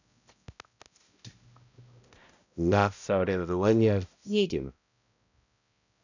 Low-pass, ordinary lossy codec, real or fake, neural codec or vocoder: 7.2 kHz; none; fake; codec, 16 kHz, 0.5 kbps, X-Codec, HuBERT features, trained on balanced general audio